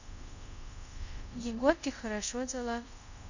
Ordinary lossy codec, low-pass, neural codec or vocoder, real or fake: none; 7.2 kHz; codec, 24 kHz, 0.5 kbps, DualCodec; fake